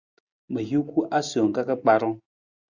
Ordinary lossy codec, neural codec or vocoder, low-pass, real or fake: Opus, 64 kbps; none; 7.2 kHz; real